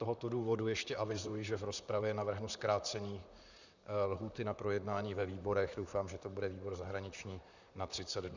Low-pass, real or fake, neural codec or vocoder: 7.2 kHz; fake; vocoder, 44.1 kHz, 128 mel bands, Pupu-Vocoder